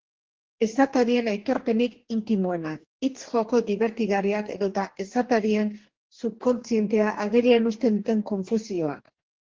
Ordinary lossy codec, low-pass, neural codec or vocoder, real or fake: Opus, 16 kbps; 7.2 kHz; codec, 44.1 kHz, 2.6 kbps, DAC; fake